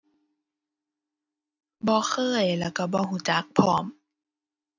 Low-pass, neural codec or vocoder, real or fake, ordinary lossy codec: 7.2 kHz; none; real; none